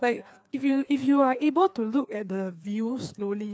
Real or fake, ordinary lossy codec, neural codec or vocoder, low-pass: fake; none; codec, 16 kHz, 2 kbps, FreqCodec, larger model; none